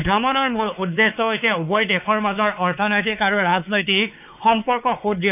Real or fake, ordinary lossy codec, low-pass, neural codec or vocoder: fake; none; 3.6 kHz; codec, 16 kHz, 4 kbps, X-Codec, WavLM features, trained on Multilingual LibriSpeech